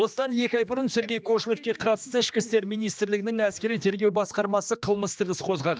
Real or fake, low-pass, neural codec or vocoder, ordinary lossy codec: fake; none; codec, 16 kHz, 2 kbps, X-Codec, HuBERT features, trained on general audio; none